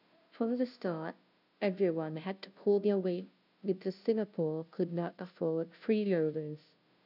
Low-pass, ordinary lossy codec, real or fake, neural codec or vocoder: 5.4 kHz; none; fake; codec, 16 kHz, 0.5 kbps, FunCodec, trained on Chinese and English, 25 frames a second